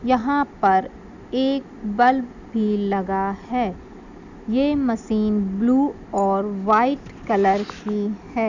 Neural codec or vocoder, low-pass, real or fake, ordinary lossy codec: none; 7.2 kHz; real; none